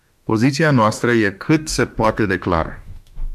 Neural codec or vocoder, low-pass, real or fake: autoencoder, 48 kHz, 32 numbers a frame, DAC-VAE, trained on Japanese speech; 14.4 kHz; fake